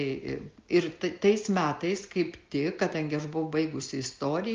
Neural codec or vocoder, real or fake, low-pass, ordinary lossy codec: none; real; 7.2 kHz; Opus, 32 kbps